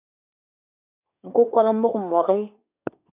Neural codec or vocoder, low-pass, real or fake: codec, 24 kHz, 1 kbps, SNAC; 3.6 kHz; fake